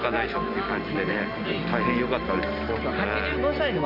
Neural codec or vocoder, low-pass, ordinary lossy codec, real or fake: none; 5.4 kHz; none; real